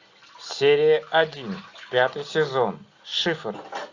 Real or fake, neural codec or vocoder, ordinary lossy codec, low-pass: real; none; AAC, 48 kbps; 7.2 kHz